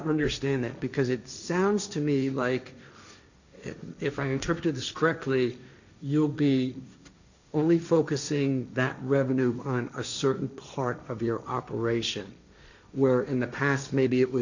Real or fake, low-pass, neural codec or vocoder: fake; 7.2 kHz; codec, 16 kHz, 1.1 kbps, Voila-Tokenizer